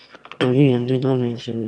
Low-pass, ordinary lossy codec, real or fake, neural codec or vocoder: none; none; fake; autoencoder, 22.05 kHz, a latent of 192 numbers a frame, VITS, trained on one speaker